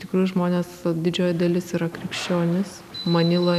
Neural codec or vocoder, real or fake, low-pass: none; real; 14.4 kHz